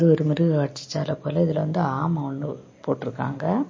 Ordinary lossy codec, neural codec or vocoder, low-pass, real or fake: MP3, 32 kbps; none; 7.2 kHz; real